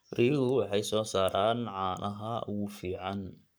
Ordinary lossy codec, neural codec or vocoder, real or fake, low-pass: none; codec, 44.1 kHz, 7.8 kbps, Pupu-Codec; fake; none